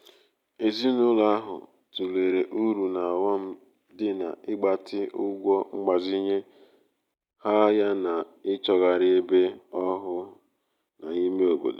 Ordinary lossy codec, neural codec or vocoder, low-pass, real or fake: none; none; 19.8 kHz; real